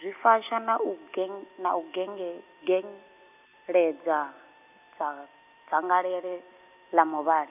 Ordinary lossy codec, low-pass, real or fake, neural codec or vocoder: none; 3.6 kHz; real; none